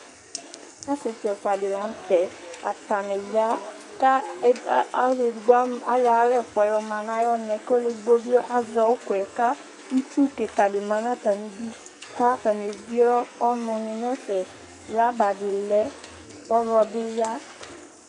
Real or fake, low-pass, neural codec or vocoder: fake; 10.8 kHz; codec, 44.1 kHz, 2.6 kbps, SNAC